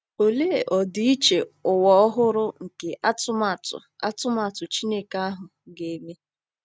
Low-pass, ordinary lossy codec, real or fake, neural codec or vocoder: none; none; real; none